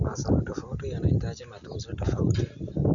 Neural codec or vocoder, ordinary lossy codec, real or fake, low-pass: none; none; real; 7.2 kHz